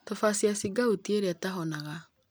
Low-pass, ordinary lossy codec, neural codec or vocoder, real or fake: none; none; none; real